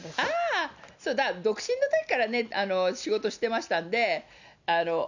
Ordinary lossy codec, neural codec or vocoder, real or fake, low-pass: none; none; real; 7.2 kHz